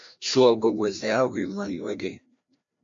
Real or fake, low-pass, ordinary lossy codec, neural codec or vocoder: fake; 7.2 kHz; MP3, 48 kbps; codec, 16 kHz, 1 kbps, FreqCodec, larger model